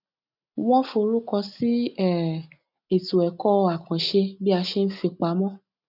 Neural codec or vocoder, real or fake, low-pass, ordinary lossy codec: none; real; 5.4 kHz; none